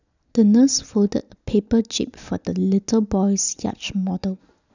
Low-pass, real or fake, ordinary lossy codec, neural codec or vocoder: 7.2 kHz; real; none; none